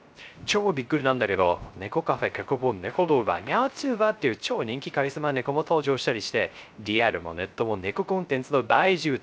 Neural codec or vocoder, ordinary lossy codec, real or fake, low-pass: codec, 16 kHz, 0.3 kbps, FocalCodec; none; fake; none